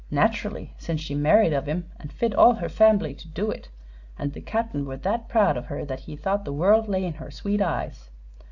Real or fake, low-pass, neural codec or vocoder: real; 7.2 kHz; none